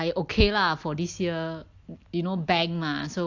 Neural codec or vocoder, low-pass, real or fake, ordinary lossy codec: none; 7.2 kHz; real; AAC, 48 kbps